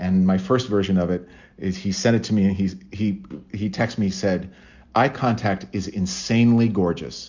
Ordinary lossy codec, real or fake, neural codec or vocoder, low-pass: Opus, 64 kbps; real; none; 7.2 kHz